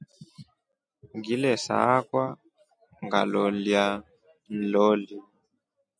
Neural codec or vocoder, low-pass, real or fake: none; 9.9 kHz; real